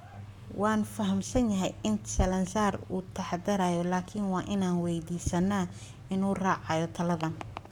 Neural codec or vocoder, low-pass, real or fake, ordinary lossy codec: codec, 44.1 kHz, 7.8 kbps, Pupu-Codec; 19.8 kHz; fake; none